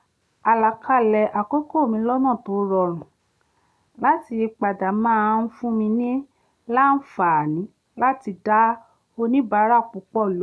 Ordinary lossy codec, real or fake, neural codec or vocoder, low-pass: none; real; none; none